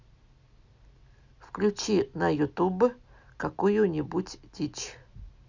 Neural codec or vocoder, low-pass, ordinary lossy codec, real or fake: none; 7.2 kHz; Opus, 64 kbps; real